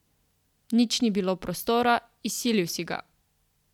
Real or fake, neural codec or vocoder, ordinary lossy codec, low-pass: real; none; none; 19.8 kHz